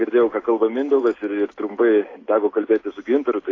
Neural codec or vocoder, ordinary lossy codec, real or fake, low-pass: none; AAC, 32 kbps; real; 7.2 kHz